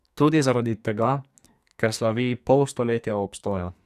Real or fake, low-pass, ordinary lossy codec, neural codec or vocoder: fake; 14.4 kHz; none; codec, 44.1 kHz, 2.6 kbps, SNAC